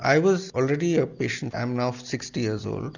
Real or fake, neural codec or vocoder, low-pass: real; none; 7.2 kHz